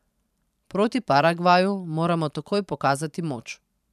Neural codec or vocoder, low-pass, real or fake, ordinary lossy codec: vocoder, 44.1 kHz, 128 mel bands every 512 samples, BigVGAN v2; 14.4 kHz; fake; none